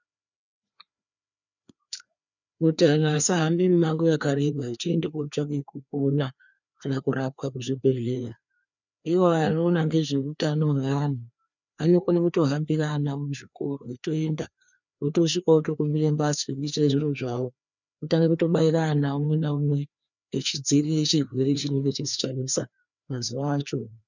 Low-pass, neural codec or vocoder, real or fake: 7.2 kHz; codec, 16 kHz, 2 kbps, FreqCodec, larger model; fake